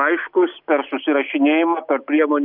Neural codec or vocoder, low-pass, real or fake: none; 5.4 kHz; real